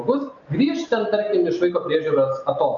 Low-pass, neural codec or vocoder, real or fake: 7.2 kHz; none; real